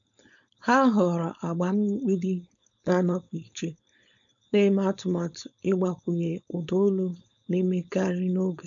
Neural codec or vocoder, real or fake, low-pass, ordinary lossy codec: codec, 16 kHz, 4.8 kbps, FACodec; fake; 7.2 kHz; none